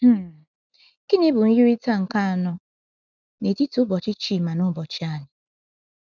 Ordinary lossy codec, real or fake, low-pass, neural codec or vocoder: none; real; 7.2 kHz; none